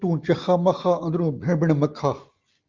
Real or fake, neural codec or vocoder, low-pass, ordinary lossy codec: real; none; 7.2 kHz; Opus, 24 kbps